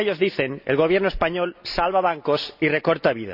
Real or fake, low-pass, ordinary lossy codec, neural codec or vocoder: real; 5.4 kHz; none; none